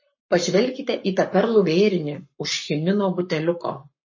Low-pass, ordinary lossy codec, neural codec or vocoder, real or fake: 7.2 kHz; MP3, 32 kbps; codec, 44.1 kHz, 7.8 kbps, Pupu-Codec; fake